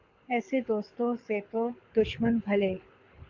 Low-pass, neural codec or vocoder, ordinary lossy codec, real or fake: 7.2 kHz; codec, 24 kHz, 6 kbps, HILCodec; AAC, 48 kbps; fake